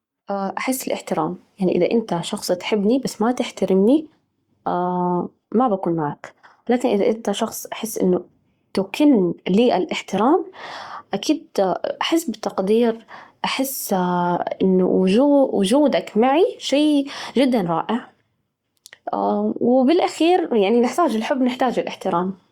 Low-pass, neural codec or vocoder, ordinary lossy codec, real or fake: 19.8 kHz; codec, 44.1 kHz, 7.8 kbps, Pupu-Codec; Opus, 64 kbps; fake